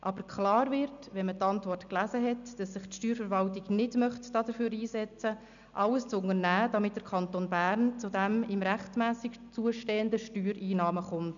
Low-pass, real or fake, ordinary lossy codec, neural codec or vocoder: 7.2 kHz; real; none; none